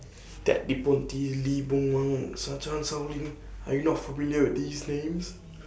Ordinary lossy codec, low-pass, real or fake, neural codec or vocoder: none; none; real; none